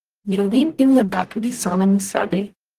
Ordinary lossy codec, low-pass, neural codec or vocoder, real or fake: Opus, 24 kbps; 14.4 kHz; codec, 44.1 kHz, 0.9 kbps, DAC; fake